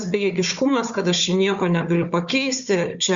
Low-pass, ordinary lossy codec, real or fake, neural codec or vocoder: 7.2 kHz; Opus, 64 kbps; fake; codec, 16 kHz, 4 kbps, FunCodec, trained on Chinese and English, 50 frames a second